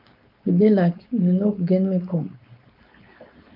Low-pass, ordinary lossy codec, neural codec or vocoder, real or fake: 5.4 kHz; AAC, 48 kbps; codec, 16 kHz, 4.8 kbps, FACodec; fake